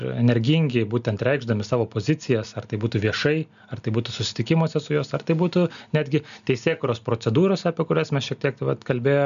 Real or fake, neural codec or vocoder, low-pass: real; none; 7.2 kHz